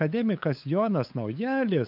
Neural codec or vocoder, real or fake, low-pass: codec, 16 kHz, 8 kbps, FunCodec, trained on LibriTTS, 25 frames a second; fake; 5.4 kHz